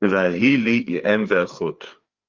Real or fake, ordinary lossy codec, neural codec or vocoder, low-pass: fake; Opus, 24 kbps; codec, 16 kHz, 4 kbps, FreqCodec, larger model; 7.2 kHz